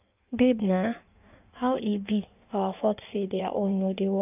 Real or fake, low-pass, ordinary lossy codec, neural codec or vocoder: fake; 3.6 kHz; none; codec, 16 kHz in and 24 kHz out, 1.1 kbps, FireRedTTS-2 codec